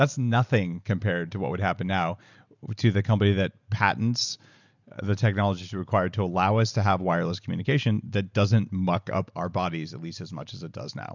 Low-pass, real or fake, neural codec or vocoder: 7.2 kHz; real; none